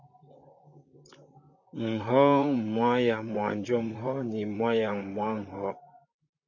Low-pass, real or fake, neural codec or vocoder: 7.2 kHz; fake; vocoder, 44.1 kHz, 128 mel bands, Pupu-Vocoder